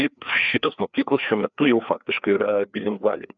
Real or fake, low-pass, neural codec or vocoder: fake; 7.2 kHz; codec, 16 kHz, 2 kbps, FreqCodec, larger model